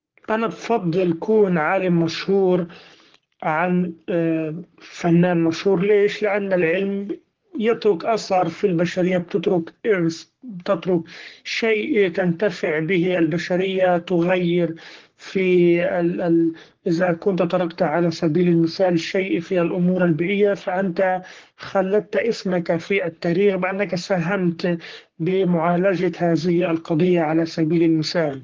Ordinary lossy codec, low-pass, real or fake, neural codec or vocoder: Opus, 16 kbps; 7.2 kHz; fake; codec, 44.1 kHz, 3.4 kbps, Pupu-Codec